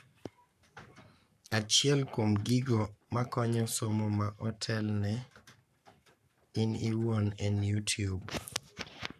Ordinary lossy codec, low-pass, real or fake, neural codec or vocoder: none; 14.4 kHz; fake; codec, 44.1 kHz, 7.8 kbps, Pupu-Codec